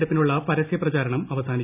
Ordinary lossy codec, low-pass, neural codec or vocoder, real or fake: none; 3.6 kHz; none; real